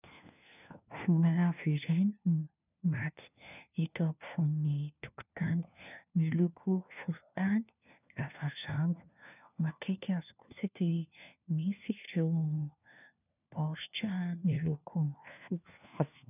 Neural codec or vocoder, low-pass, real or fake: codec, 16 kHz, 1 kbps, FunCodec, trained on LibriTTS, 50 frames a second; 3.6 kHz; fake